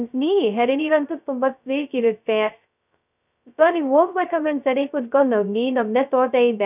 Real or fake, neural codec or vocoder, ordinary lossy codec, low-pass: fake; codec, 16 kHz, 0.2 kbps, FocalCodec; none; 3.6 kHz